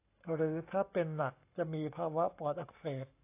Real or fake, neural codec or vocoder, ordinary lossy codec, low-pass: fake; codec, 44.1 kHz, 7.8 kbps, Pupu-Codec; AAC, 24 kbps; 3.6 kHz